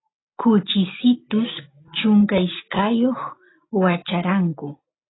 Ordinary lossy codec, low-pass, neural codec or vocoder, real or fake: AAC, 16 kbps; 7.2 kHz; none; real